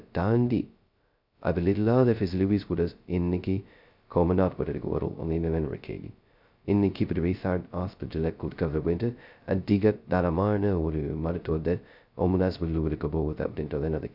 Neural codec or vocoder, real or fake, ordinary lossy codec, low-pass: codec, 16 kHz, 0.2 kbps, FocalCodec; fake; none; 5.4 kHz